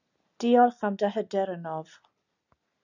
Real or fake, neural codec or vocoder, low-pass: real; none; 7.2 kHz